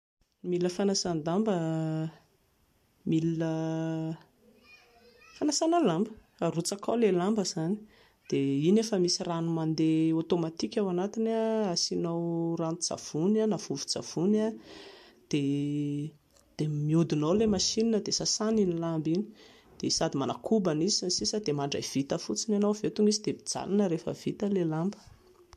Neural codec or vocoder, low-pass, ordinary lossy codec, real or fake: none; 14.4 kHz; MP3, 64 kbps; real